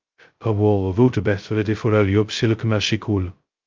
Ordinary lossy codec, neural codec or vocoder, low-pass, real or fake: Opus, 32 kbps; codec, 16 kHz, 0.2 kbps, FocalCodec; 7.2 kHz; fake